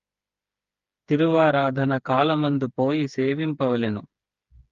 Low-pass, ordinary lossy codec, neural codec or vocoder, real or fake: 7.2 kHz; Opus, 32 kbps; codec, 16 kHz, 4 kbps, FreqCodec, smaller model; fake